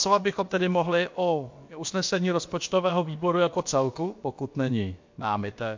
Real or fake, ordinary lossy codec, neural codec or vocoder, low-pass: fake; MP3, 48 kbps; codec, 16 kHz, about 1 kbps, DyCAST, with the encoder's durations; 7.2 kHz